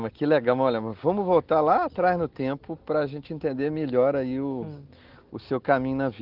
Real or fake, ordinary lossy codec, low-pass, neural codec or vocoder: real; Opus, 16 kbps; 5.4 kHz; none